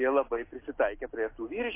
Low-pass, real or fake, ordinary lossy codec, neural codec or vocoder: 3.6 kHz; real; MP3, 24 kbps; none